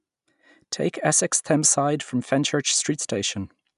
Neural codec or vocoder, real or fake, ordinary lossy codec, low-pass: none; real; none; 10.8 kHz